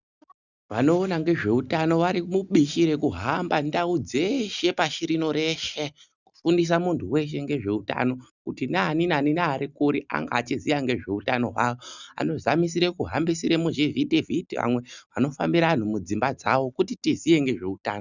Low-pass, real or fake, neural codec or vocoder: 7.2 kHz; real; none